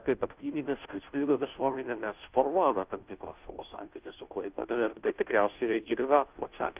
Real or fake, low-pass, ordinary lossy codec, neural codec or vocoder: fake; 3.6 kHz; Opus, 24 kbps; codec, 16 kHz, 0.5 kbps, FunCodec, trained on Chinese and English, 25 frames a second